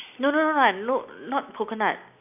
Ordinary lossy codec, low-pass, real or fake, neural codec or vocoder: none; 3.6 kHz; real; none